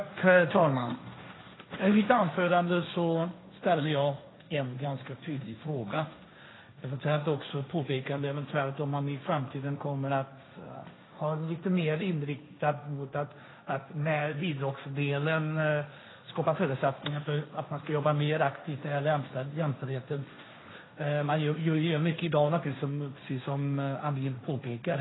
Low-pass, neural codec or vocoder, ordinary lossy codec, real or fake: 7.2 kHz; codec, 16 kHz, 1.1 kbps, Voila-Tokenizer; AAC, 16 kbps; fake